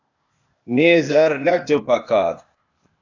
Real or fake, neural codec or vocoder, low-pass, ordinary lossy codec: fake; codec, 16 kHz, 0.8 kbps, ZipCodec; 7.2 kHz; AAC, 48 kbps